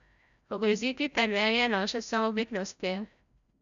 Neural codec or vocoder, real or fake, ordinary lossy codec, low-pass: codec, 16 kHz, 0.5 kbps, FreqCodec, larger model; fake; MP3, 96 kbps; 7.2 kHz